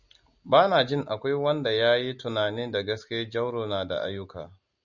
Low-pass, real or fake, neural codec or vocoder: 7.2 kHz; real; none